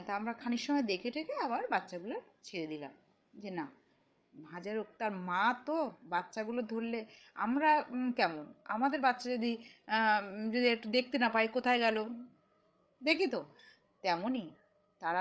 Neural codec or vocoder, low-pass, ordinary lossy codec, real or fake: codec, 16 kHz, 8 kbps, FreqCodec, larger model; none; none; fake